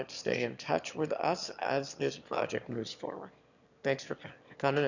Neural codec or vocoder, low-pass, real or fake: autoencoder, 22.05 kHz, a latent of 192 numbers a frame, VITS, trained on one speaker; 7.2 kHz; fake